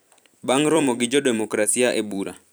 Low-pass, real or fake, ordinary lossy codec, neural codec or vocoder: none; fake; none; vocoder, 44.1 kHz, 128 mel bands every 256 samples, BigVGAN v2